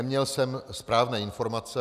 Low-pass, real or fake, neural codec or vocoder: 14.4 kHz; real; none